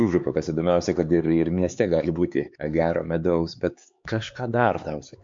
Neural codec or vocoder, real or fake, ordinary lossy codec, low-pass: codec, 16 kHz, 4 kbps, X-Codec, HuBERT features, trained on LibriSpeech; fake; MP3, 48 kbps; 7.2 kHz